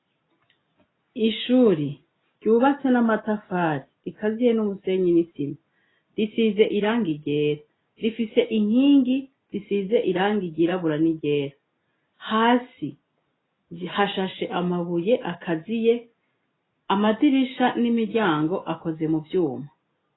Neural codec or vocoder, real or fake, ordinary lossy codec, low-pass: none; real; AAC, 16 kbps; 7.2 kHz